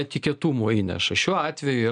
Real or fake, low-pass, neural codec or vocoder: real; 9.9 kHz; none